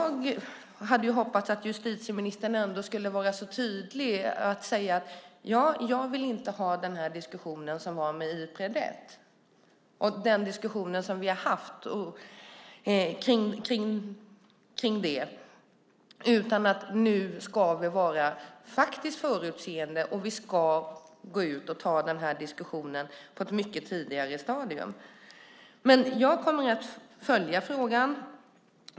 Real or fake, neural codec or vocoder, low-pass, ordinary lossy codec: real; none; none; none